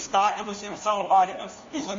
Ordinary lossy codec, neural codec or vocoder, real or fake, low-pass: MP3, 32 kbps; codec, 16 kHz, 1 kbps, FunCodec, trained on LibriTTS, 50 frames a second; fake; 7.2 kHz